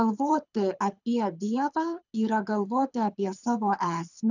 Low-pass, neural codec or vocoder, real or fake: 7.2 kHz; codec, 24 kHz, 6 kbps, HILCodec; fake